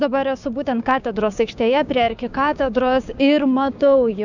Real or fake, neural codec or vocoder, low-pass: fake; codec, 16 kHz, 6 kbps, DAC; 7.2 kHz